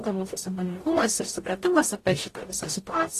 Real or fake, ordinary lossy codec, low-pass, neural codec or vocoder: fake; AAC, 48 kbps; 14.4 kHz; codec, 44.1 kHz, 0.9 kbps, DAC